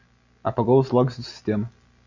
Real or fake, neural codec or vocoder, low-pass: real; none; 7.2 kHz